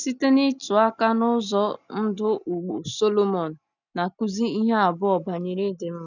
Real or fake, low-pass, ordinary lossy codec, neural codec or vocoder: real; 7.2 kHz; none; none